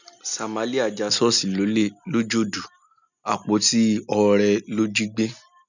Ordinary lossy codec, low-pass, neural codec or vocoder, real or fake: none; 7.2 kHz; none; real